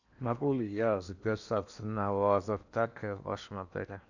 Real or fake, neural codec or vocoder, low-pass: fake; codec, 16 kHz in and 24 kHz out, 0.8 kbps, FocalCodec, streaming, 65536 codes; 7.2 kHz